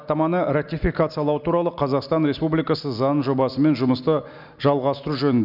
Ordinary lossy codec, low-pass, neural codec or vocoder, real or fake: none; 5.4 kHz; none; real